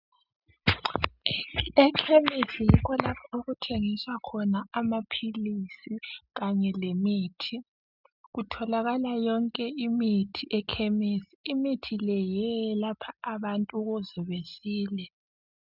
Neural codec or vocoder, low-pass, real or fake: none; 5.4 kHz; real